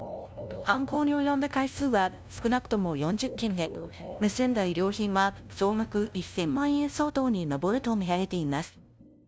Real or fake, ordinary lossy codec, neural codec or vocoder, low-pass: fake; none; codec, 16 kHz, 0.5 kbps, FunCodec, trained on LibriTTS, 25 frames a second; none